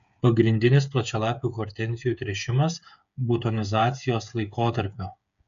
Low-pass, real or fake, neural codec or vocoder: 7.2 kHz; fake; codec, 16 kHz, 8 kbps, FreqCodec, smaller model